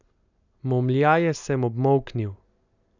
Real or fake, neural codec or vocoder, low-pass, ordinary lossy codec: real; none; 7.2 kHz; none